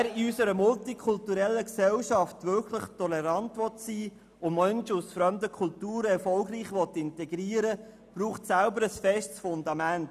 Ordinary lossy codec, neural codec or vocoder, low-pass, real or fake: none; none; 14.4 kHz; real